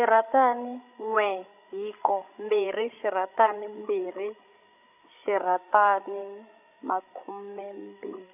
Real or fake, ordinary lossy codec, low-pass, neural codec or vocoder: fake; none; 3.6 kHz; codec, 16 kHz, 16 kbps, FreqCodec, larger model